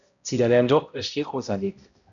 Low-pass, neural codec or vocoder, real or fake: 7.2 kHz; codec, 16 kHz, 0.5 kbps, X-Codec, HuBERT features, trained on balanced general audio; fake